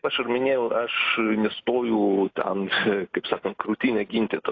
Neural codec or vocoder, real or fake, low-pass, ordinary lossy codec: none; real; 7.2 kHz; AAC, 32 kbps